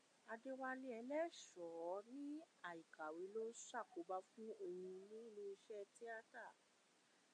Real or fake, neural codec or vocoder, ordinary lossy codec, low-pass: real; none; MP3, 48 kbps; 9.9 kHz